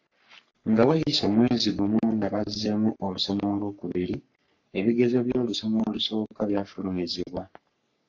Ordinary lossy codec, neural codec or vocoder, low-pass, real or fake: AAC, 48 kbps; codec, 44.1 kHz, 3.4 kbps, Pupu-Codec; 7.2 kHz; fake